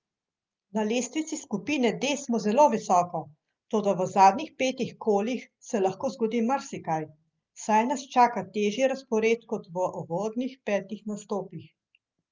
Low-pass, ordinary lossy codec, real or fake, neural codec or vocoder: 7.2 kHz; Opus, 24 kbps; real; none